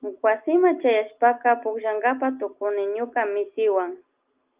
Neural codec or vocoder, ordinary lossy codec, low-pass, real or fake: none; Opus, 24 kbps; 3.6 kHz; real